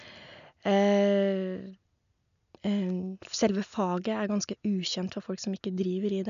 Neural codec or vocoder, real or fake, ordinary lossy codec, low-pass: none; real; none; 7.2 kHz